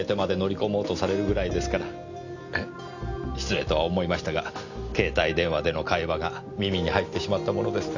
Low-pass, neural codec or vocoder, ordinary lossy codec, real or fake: 7.2 kHz; none; none; real